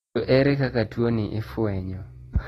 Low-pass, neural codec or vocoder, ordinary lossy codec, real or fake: 19.8 kHz; none; AAC, 32 kbps; real